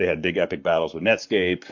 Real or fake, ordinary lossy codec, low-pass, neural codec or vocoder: fake; MP3, 48 kbps; 7.2 kHz; codec, 44.1 kHz, 7.8 kbps, Pupu-Codec